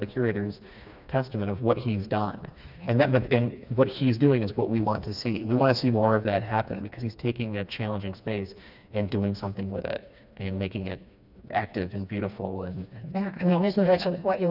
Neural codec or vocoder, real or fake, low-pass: codec, 16 kHz, 2 kbps, FreqCodec, smaller model; fake; 5.4 kHz